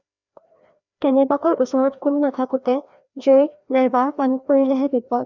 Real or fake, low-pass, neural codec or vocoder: fake; 7.2 kHz; codec, 16 kHz, 1 kbps, FreqCodec, larger model